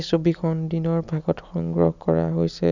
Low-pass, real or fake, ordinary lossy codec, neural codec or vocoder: 7.2 kHz; real; none; none